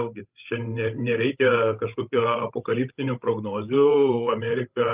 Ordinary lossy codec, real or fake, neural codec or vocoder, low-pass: Opus, 32 kbps; fake; codec, 16 kHz, 16 kbps, FreqCodec, larger model; 3.6 kHz